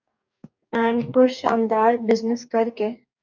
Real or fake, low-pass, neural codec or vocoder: fake; 7.2 kHz; codec, 44.1 kHz, 2.6 kbps, DAC